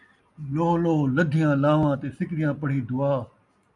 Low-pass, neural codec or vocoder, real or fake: 10.8 kHz; none; real